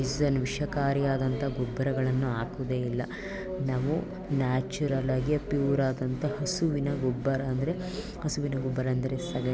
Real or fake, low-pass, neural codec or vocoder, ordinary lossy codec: real; none; none; none